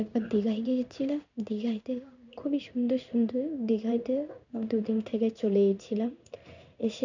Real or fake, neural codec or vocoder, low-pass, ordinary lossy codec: fake; codec, 16 kHz in and 24 kHz out, 1 kbps, XY-Tokenizer; 7.2 kHz; none